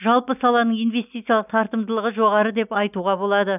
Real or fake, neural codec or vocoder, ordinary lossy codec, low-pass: real; none; none; 3.6 kHz